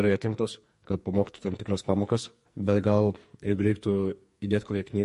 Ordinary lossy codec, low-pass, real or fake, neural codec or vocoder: MP3, 48 kbps; 14.4 kHz; fake; codec, 44.1 kHz, 2.6 kbps, SNAC